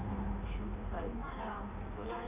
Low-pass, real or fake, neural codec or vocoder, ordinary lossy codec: 3.6 kHz; fake; codec, 16 kHz in and 24 kHz out, 1.1 kbps, FireRedTTS-2 codec; MP3, 32 kbps